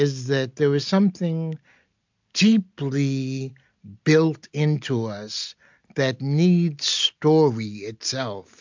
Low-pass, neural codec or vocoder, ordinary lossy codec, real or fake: 7.2 kHz; none; MP3, 64 kbps; real